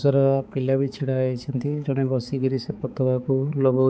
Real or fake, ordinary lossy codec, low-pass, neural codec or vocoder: fake; none; none; codec, 16 kHz, 4 kbps, X-Codec, HuBERT features, trained on balanced general audio